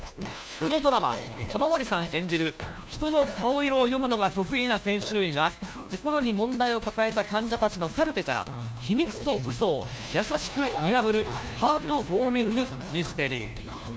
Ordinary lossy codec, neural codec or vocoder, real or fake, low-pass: none; codec, 16 kHz, 1 kbps, FunCodec, trained on LibriTTS, 50 frames a second; fake; none